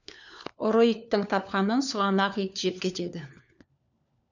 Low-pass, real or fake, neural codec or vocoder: 7.2 kHz; fake; codec, 16 kHz, 4 kbps, FunCodec, trained on LibriTTS, 50 frames a second